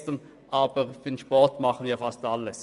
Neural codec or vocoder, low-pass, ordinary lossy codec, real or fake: vocoder, 24 kHz, 100 mel bands, Vocos; 10.8 kHz; AAC, 96 kbps; fake